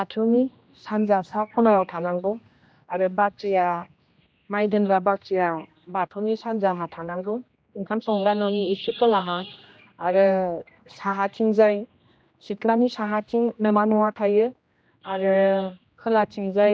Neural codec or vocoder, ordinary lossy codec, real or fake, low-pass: codec, 16 kHz, 1 kbps, X-Codec, HuBERT features, trained on general audio; none; fake; none